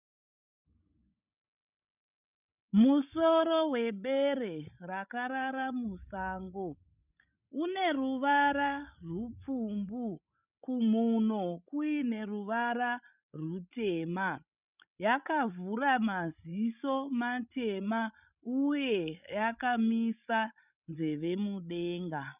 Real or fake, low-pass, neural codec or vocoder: fake; 3.6 kHz; codec, 16 kHz, 16 kbps, FreqCodec, larger model